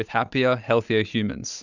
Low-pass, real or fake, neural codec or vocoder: 7.2 kHz; real; none